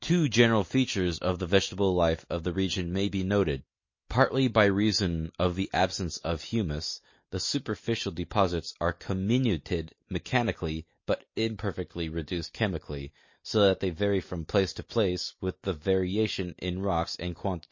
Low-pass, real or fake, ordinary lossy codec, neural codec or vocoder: 7.2 kHz; real; MP3, 32 kbps; none